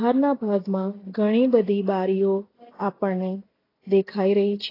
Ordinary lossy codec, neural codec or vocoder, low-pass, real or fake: AAC, 24 kbps; none; 5.4 kHz; real